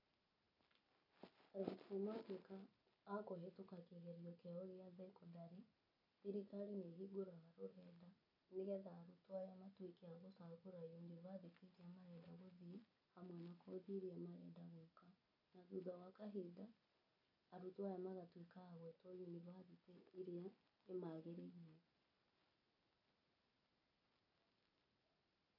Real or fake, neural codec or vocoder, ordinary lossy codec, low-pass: real; none; none; 5.4 kHz